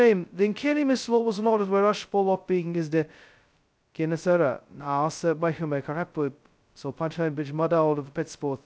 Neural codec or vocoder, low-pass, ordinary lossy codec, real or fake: codec, 16 kHz, 0.2 kbps, FocalCodec; none; none; fake